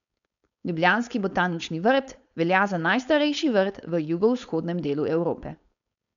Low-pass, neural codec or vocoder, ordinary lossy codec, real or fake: 7.2 kHz; codec, 16 kHz, 4.8 kbps, FACodec; none; fake